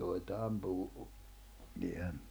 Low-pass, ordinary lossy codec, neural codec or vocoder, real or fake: none; none; none; real